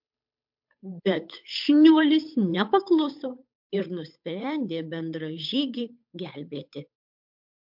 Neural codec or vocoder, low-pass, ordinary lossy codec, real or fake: codec, 16 kHz, 8 kbps, FunCodec, trained on Chinese and English, 25 frames a second; 5.4 kHz; MP3, 48 kbps; fake